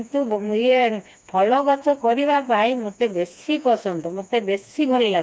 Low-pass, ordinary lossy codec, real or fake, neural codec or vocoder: none; none; fake; codec, 16 kHz, 2 kbps, FreqCodec, smaller model